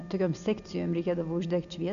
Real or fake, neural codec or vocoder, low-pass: real; none; 7.2 kHz